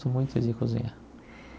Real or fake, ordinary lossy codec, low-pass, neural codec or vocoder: real; none; none; none